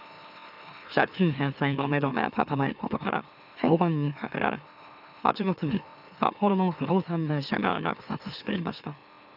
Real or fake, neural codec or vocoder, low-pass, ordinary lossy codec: fake; autoencoder, 44.1 kHz, a latent of 192 numbers a frame, MeloTTS; 5.4 kHz; none